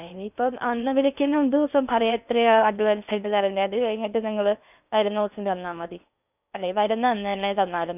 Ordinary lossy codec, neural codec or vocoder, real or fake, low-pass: none; codec, 16 kHz in and 24 kHz out, 0.8 kbps, FocalCodec, streaming, 65536 codes; fake; 3.6 kHz